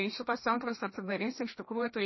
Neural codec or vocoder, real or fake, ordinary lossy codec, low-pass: codec, 16 kHz, 1 kbps, FreqCodec, larger model; fake; MP3, 24 kbps; 7.2 kHz